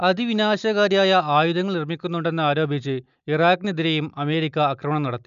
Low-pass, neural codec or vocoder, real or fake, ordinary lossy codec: 7.2 kHz; none; real; none